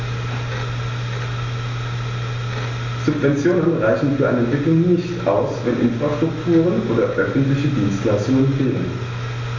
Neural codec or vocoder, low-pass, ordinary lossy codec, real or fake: none; 7.2 kHz; AAC, 32 kbps; real